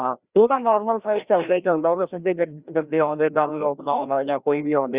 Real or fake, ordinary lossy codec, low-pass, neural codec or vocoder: fake; Opus, 64 kbps; 3.6 kHz; codec, 16 kHz, 1 kbps, FreqCodec, larger model